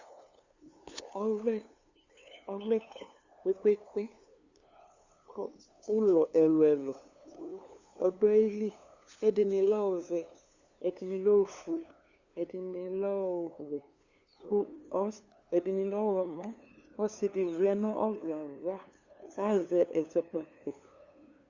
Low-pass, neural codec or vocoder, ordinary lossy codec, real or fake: 7.2 kHz; codec, 16 kHz, 2 kbps, FunCodec, trained on LibriTTS, 25 frames a second; Opus, 64 kbps; fake